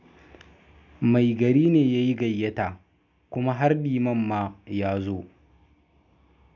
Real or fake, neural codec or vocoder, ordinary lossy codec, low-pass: real; none; none; 7.2 kHz